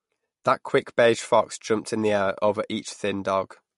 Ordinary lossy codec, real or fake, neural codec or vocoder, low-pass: MP3, 48 kbps; real; none; 14.4 kHz